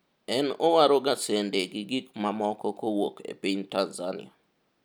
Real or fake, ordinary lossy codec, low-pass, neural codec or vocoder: real; none; none; none